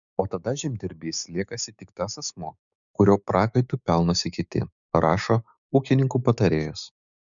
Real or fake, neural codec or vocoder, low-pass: fake; codec, 16 kHz, 6 kbps, DAC; 7.2 kHz